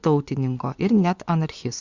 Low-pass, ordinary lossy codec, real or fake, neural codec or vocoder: 7.2 kHz; Opus, 64 kbps; fake; vocoder, 24 kHz, 100 mel bands, Vocos